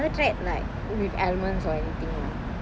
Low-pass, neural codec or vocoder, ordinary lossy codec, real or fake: none; none; none; real